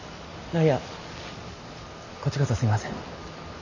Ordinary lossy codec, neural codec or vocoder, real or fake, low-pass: none; none; real; 7.2 kHz